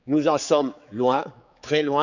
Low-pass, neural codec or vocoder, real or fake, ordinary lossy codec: 7.2 kHz; codec, 16 kHz, 4 kbps, X-Codec, HuBERT features, trained on general audio; fake; none